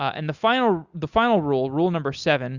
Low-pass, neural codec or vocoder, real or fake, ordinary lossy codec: 7.2 kHz; none; real; Opus, 64 kbps